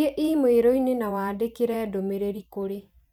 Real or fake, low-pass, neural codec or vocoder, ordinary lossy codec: fake; 19.8 kHz; vocoder, 44.1 kHz, 128 mel bands every 512 samples, BigVGAN v2; none